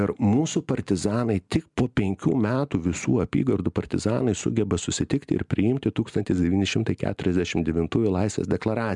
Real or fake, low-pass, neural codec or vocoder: real; 10.8 kHz; none